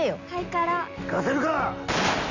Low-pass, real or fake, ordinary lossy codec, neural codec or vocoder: 7.2 kHz; real; none; none